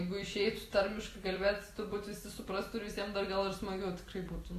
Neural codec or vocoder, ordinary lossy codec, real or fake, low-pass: none; AAC, 48 kbps; real; 14.4 kHz